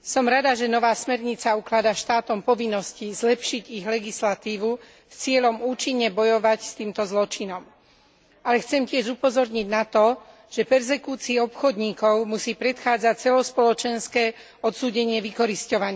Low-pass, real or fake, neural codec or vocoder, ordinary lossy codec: none; real; none; none